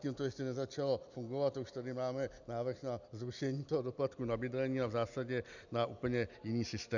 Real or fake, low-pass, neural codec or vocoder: real; 7.2 kHz; none